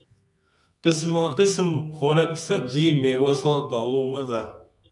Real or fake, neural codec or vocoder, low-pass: fake; codec, 24 kHz, 0.9 kbps, WavTokenizer, medium music audio release; 10.8 kHz